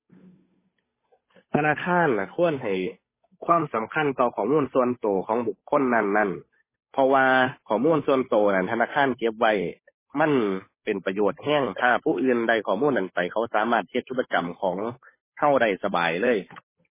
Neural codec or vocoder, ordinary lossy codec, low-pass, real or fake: codec, 16 kHz, 8 kbps, FunCodec, trained on Chinese and English, 25 frames a second; MP3, 16 kbps; 3.6 kHz; fake